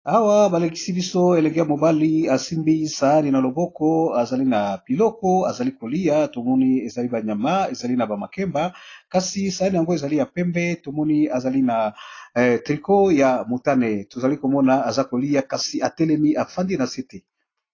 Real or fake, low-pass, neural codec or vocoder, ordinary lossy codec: real; 7.2 kHz; none; AAC, 32 kbps